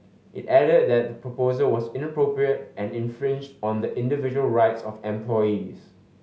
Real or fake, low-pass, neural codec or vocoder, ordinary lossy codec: real; none; none; none